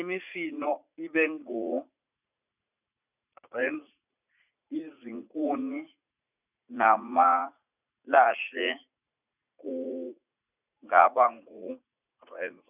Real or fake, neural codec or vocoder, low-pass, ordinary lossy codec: fake; vocoder, 44.1 kHz, 80 mel bands, Vocos; 3.6 kHz; none